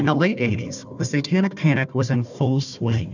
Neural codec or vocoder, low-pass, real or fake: codec, 16 kHz, 1 kbps, FunCodec, trained on Chinese and English, 50 frames a second; 7.2 kHz; fake